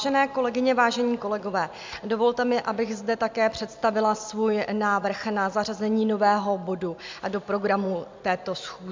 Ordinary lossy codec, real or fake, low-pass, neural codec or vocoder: MP3, 64 kbps; real; 7.2 kHz; none